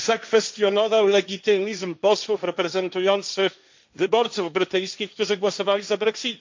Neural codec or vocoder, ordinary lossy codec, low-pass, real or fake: codec, 16 kHz, 1.1 kbps, Voila-Tokenizer; MP3, 64 kbps; 7.2 kHz; fake